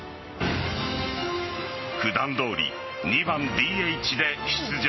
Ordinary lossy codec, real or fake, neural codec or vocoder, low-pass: MP3, 24 kbps; real; none; 7.2 kHz